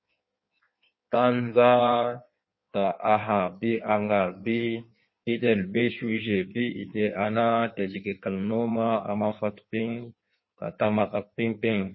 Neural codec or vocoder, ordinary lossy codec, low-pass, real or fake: codec, 16 kHz in and 24 kHz out, 1.1 kbps, FireRedTTS-2 codec; MP3, 24 kbps; 7.2 kHz; fake